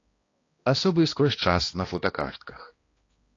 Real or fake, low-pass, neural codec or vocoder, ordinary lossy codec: fake; 7.2 kHz; codec, 16 kHz, 1 kbps, X-Codec, HuBERT features, trained on balanced general audio; AAC, 32 kbps